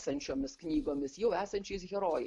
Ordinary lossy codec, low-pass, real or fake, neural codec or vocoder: AAC, 64 kbps; 10.8 kHz; real; none